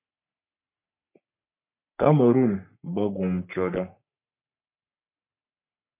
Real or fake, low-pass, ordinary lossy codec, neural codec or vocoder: fake; 3.6 kHz; MP3, 32 kbps; codec, 44.1 kHz, 3.4 kbps, Pupu-Codec